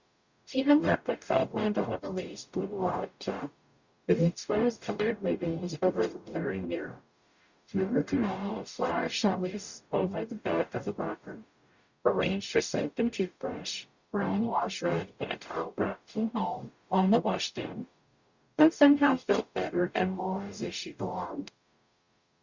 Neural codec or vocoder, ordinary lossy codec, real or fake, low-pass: codec, 44.1 kHz, 0.9 kbps, DAC; Opus, 64 kbps; fake; 7.2 kHz